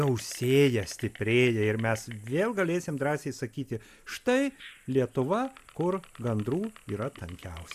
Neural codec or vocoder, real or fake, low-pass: none; real; 14.4 kHz